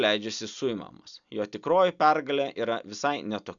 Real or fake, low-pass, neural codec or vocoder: real; 7.2 kHz; none